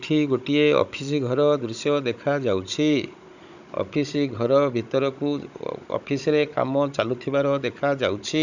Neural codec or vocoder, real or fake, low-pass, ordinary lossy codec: codec, 16 kHz, 16 kbps, FunCodec, trained on Chinese and English, 50 frames a second; fake; 7.2 kHz; none